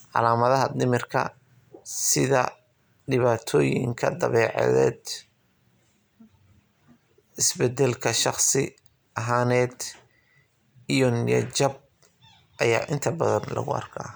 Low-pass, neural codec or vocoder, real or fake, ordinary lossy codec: none; none; real; none